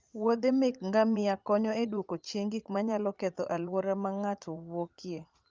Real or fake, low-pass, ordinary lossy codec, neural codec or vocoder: fake; 7.2 kHz; Opus, 32 kbps; vocoder, 44.1 kHz, 80 mel bands, Vocos